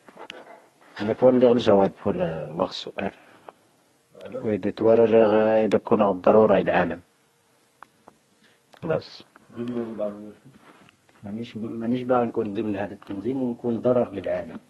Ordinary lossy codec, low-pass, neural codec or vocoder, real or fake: AAC, 32 kbps; 14.4 kHz; codec, 32 kHz, 1.9 kbps, SNAC; fake